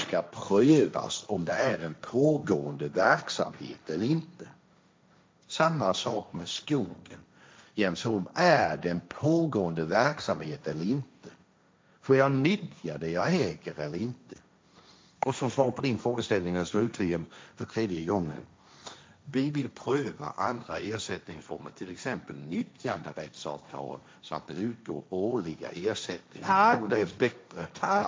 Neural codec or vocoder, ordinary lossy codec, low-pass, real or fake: codec, 16 kHz, 1.1 kbps, Voila-Tokenizer; none; none; fake